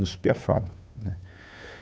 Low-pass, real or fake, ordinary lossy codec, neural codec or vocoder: none; fake; none; codec, 16 kHz, 2 kbps, FunCodec, trained on Chinese and English, 25 frames a second